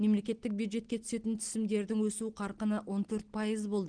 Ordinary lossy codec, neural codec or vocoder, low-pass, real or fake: Opus, 16 kbps; none; 9.9 kHz; real